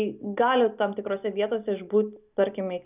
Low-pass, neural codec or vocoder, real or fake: 3.6 kHz; none; real